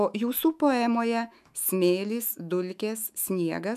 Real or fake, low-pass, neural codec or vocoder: fake; 14.4 kHz; autoencoder, 48 kHz, 128 numbers a frame, DAC-VAE, trained on Japanese speech